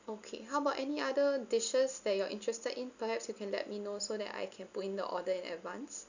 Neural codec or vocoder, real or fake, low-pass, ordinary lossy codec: none; real; 7.2 kHz; none